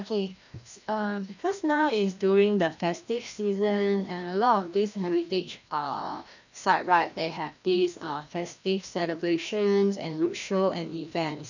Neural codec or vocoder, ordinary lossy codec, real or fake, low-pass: codec, 16 kHz, 1 kbps, FreqCodec, larger model; none; fake; 7.2 kHz